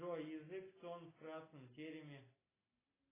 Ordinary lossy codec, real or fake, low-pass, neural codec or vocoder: AAC, 16 kbps; real; 3.6 kHz; none